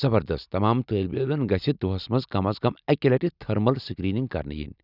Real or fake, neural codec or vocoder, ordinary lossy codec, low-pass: real; none; none; 5.4 kHz